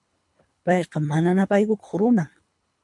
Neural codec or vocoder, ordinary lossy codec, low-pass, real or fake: codec, 24 kHz, 3 kbps, HILCodec; MP3, 64 kbps; 10.8 kHz; fake